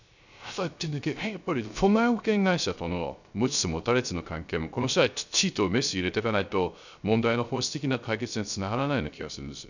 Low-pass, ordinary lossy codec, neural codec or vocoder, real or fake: 7.2 kHz; none; codec, 16 kHz, 0.3 kbps, FocalCodec; fake